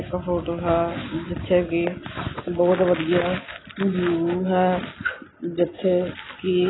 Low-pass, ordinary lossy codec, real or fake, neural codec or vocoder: 7.2 kHz; AAC, 16 kbps; real; none